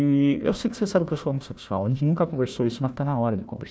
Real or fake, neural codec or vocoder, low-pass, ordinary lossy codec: fake; codec, 16 kHz, 1 kbps, FunCodec, trained on Chinese and English, 50 frames a second; none; none